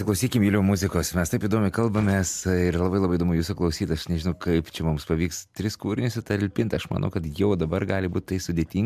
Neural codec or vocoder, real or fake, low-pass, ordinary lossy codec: none; real; 14.4 kHz; MP3, 96 kbps